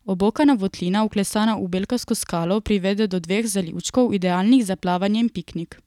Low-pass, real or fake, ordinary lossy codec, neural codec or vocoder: 19.8 kHz; real; none; none